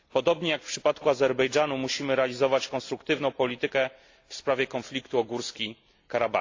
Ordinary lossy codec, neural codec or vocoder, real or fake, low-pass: AAC, 48 kbps; none; real; 7.2 kHz